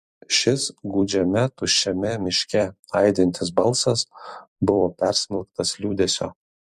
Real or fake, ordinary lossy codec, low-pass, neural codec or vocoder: fake; MP3, 64 kbps; 14.4 kHz; vocoder, 44.1 kHz, 128 mel bands every 256 samples, BigVGAN v2